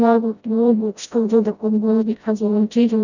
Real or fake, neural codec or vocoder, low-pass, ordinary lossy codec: fake; codec, 16 kHz, 0.5 kbps, FreqCodec, smaller model; 7.2 kHz; none